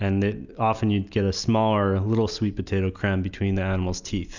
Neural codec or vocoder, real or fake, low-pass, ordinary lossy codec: none; real; 7.2 kHz; Opus, 64 kbps